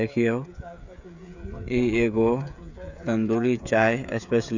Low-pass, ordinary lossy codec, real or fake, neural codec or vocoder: 7.2 kHz; none; fake; codec, 16 kHz, 16 kbps, FreqCodec, smaller model